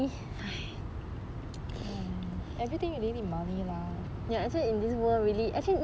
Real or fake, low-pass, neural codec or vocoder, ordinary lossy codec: real; none; none; none